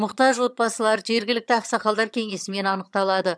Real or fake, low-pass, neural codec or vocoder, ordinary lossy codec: fake; none; vocoder, 22.05 kHz, 80 mel bands, HiFi-GAN; none